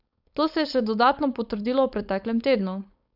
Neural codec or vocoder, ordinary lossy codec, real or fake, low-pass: codec, 16 kHz, 4.8 kbps, FACodec; none; fake; 5.4 kHz